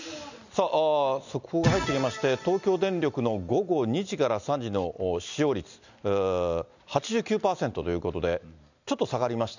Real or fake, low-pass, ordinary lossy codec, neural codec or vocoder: real; 7.2 kHz; none; none